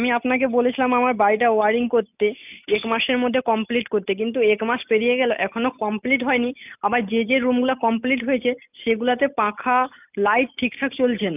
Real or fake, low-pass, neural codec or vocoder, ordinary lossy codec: real; 3.6 kHz; none; none